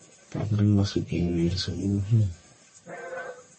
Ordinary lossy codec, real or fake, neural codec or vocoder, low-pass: MP3, 32 kbps; fake; codec, 44.1 kHz, 1.7 kbps, Pupu-Codec; 9.9 kHz